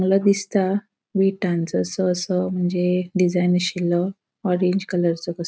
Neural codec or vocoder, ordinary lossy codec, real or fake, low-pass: none; none; real; none